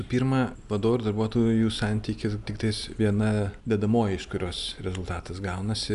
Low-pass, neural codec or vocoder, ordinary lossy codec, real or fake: 10.8 kHz; none; AAC, 96 kbps; real